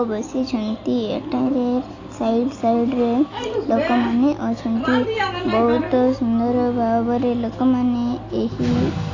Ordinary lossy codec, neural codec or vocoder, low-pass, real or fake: AAC, 32 kbps; none; 7.2 kHz; real